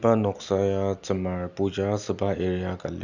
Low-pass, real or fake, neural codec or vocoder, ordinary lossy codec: 7.2 kHz; real; none; none